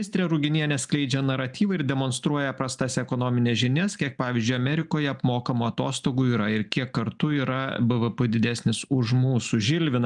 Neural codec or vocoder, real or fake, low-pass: none; real; 10.8 kHz